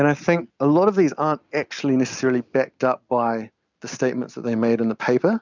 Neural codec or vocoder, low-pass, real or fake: none; 7.2 kHz; real